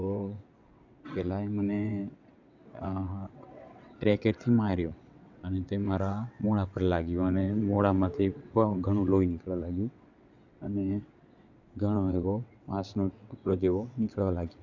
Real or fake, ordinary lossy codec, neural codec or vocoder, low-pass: fake; none; vocoder, 22.05 kHz, 80 mel bands, WaveNeXt; 7.2 kHz